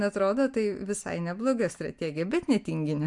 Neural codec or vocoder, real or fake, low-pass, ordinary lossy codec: none; real; 10.8 kHz; MP3, 64 kbps